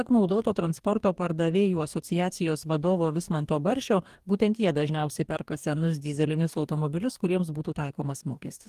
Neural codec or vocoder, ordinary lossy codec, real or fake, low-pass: codec, 44.1 kHz, 2.6 kbps, DAC; Opus, 24 kbps; fake; 14.4 kHz